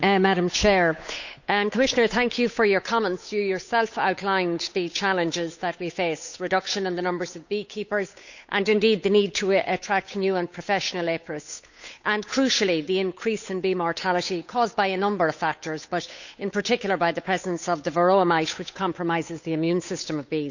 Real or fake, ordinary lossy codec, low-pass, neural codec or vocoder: fake; none; 7.2 kHz; codec, 16 kHz, 8 kbps, FunCodec, trained on Chinese and English, 25 frames a second